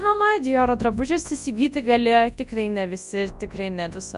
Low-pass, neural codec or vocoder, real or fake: 10.8 kHz; codec, 24 kHz, 0.9 kbps, WavTokenizer, large speech release; fake